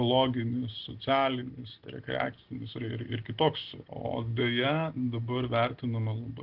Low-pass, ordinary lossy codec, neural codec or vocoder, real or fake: 5.4 kHz; Opus, 16 kbps; none; real